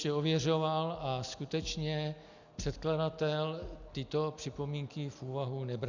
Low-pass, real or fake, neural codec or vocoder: 7.2 kHz; real; none